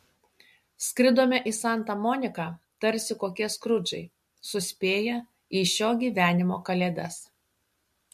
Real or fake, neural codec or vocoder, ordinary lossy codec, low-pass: real; none; MP3, 64 kbps; 14.4 kHz